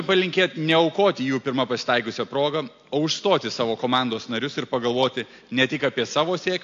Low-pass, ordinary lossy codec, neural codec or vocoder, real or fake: 7.2 kHz; AAC, 48 kbps; none; real